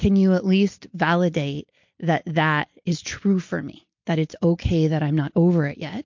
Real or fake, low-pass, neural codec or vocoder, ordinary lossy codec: real; 7.2 kHz; none; MP3, 48 kbps